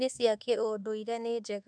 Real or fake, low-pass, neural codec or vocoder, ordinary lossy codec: fake; 9.9 kHz; codec, 24 kHz, 1.2 kbps, DualCodec; none